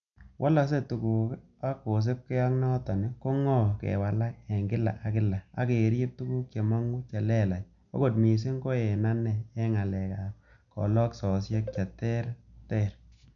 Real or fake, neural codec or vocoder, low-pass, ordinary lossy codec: real; none; 7.2 kHz; none